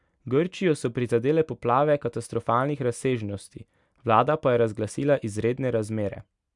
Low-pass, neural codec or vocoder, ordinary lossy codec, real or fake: 10.8 kHz; none; none; real